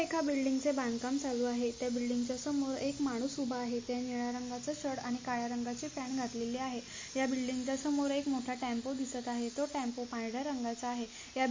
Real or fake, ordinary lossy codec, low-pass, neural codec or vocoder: real; MP3, 32 kbps; 7.2 kHz; none